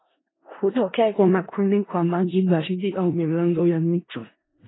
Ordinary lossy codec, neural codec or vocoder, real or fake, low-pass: AAC, 16 kbps; codec, 16 kHz in and 24 kHz out, 0.4 kbps, LongCat-Audio-Codec, four codebook decoder; fake; 7.2 kHz